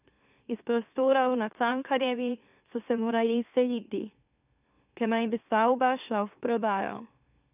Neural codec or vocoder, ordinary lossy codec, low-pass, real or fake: autoencoder, 44.1 kHz, a latent of 192 numbers a frame, MeloTTS; none; 3.6 kHz; fake